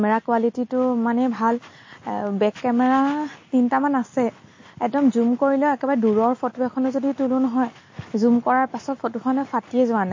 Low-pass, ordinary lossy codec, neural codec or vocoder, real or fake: 7.2 kHz; MP3, 32 kbps; none; real